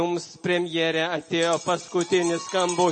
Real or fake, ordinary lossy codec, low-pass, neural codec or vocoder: real; MP3, 32 kbps; 10.8 kHz; none